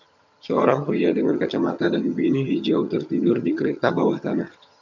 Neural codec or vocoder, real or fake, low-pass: vocoder, 22.05 kHz, 80 mel bands, HiFi-GAN; fake; 7.2 kHz